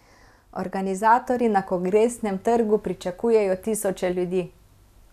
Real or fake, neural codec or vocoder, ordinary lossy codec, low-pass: real; none; none; 14.4 kHz